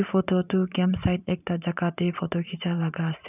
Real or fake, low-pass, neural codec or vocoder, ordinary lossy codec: fake; 3.6 kHz; vocoder, 44.1 kHz, 128 mel bands every 512 samples, BigVGAN v2; none